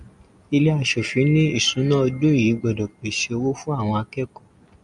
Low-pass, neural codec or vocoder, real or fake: 10.8 kHz; none; real